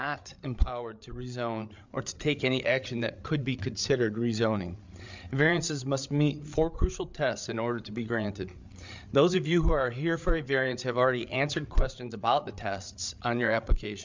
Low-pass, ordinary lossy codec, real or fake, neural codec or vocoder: 7.2 kHz; MP3, 64 kbps; fake; codec, 16 kHz, 8 kbps, FreqCodec, larger model